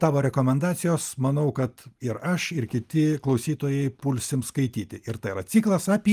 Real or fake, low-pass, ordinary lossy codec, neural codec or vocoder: real; 14.4 kHz; Opus, 32 kbps; none